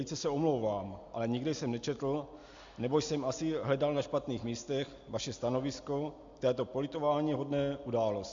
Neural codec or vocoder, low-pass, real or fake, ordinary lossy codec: none; 7.2 kHz; real; MP3, 64 kbps